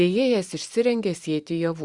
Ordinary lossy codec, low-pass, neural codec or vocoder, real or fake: Opus, 64 kbps; 10.8 kHz; vocoder, 44.1 kHz, 128 mel bands every 512 samples, BigVGAN v2; fake